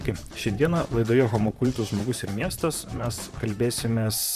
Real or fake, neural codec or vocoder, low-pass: fake; vocoder, 44.1 kHz, 128 mel bands, Pupu-Vocoder; 14.4 kHz